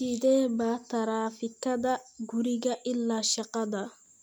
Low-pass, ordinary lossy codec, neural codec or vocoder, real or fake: none; none; none; real